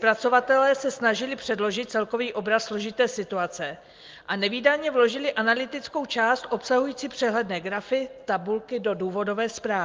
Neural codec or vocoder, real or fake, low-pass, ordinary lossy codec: none; real; 7.2 kHz; Opus, 32 kbps